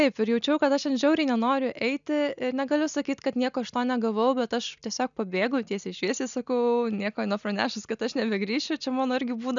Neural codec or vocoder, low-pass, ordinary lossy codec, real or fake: none; 7.2 kHz; MP3, 96 kbps; real